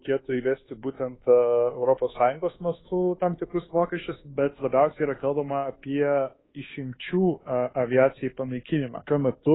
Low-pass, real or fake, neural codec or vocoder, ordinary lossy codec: 7.2 kHz; fake; codec, 24 kHz, 1.2 kbps, DualCodec; AAC, 16 kbps